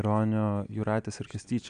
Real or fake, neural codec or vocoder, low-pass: real; none; 9.9 kHz